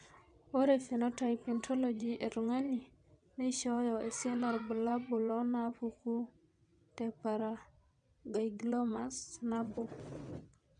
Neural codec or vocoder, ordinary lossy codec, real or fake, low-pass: vocoder, 22.05 kHz, 80 mel bands, WaveNeXt; none; fake; 9.9 kHz